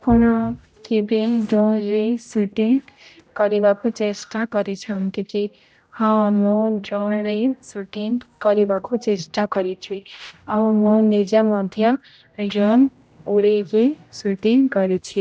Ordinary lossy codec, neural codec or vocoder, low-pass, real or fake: none; codec, 16 kHz, 0.5 kbps, X-Codec, HuBERT features, trained on general audio; none; fake